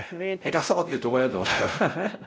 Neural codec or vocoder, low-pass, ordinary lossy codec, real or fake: codec, 16 kHz, 0.5 kbps, X-Codec, WavLM features, trained on Multilingual LibriSpeech; none; none; fake